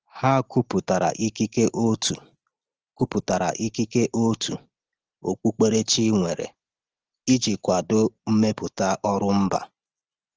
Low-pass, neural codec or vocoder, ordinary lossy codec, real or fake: 7.2 kHz; none; Opus, 16 kbps; real